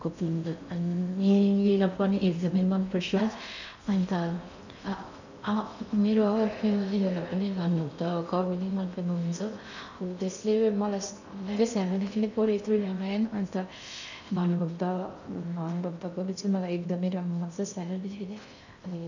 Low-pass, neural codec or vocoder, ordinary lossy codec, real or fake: 7.2 kHz; codec, 16 kHz in and 24 kHz out, 0.6 kbps, FocalCodec, streaming, 4096 codes; none; fake